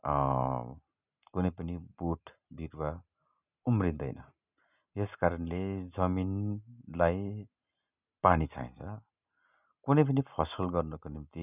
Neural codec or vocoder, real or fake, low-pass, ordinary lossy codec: none; real; 3.6 kHz; none